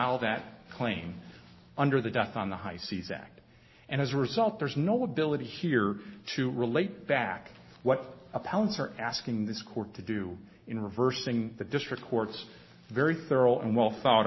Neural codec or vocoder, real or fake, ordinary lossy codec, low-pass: none; real; MP3, 24 kbps; 7.2 kHz